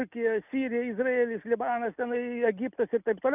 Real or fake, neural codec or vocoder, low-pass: real; none; 3.6 kHz